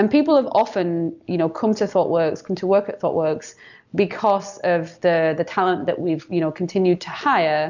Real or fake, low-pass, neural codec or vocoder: real; 7.2 kHz; none